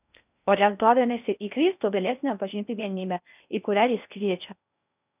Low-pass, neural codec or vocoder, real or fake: 3.6 kHz; codec, 16 kHz in and 24 kHz out, 0.6 kbps, FocalCodec, streaming, 4096 codes; fake